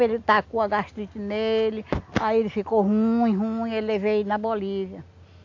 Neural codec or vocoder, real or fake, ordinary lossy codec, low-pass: none; real; AAC, 48 kbps; 7.2 kHz